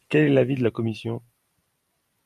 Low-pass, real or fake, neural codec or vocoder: 14.4 kHz; fake; vocoder, 44.1 kHz, 128 mel bands every 512 samples, BigVGAN v2